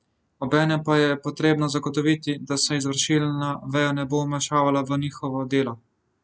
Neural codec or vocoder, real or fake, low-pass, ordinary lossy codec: none; real; none; none